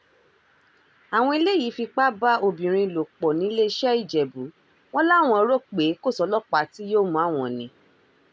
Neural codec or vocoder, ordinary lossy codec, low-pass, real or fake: none; none; none; real